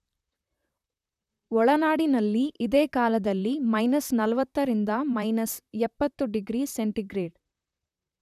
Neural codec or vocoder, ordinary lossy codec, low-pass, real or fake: vocoder, 44.1 kHz, 128 mel bands, Pupu-Vocoder; none; 14.4 kHz; fake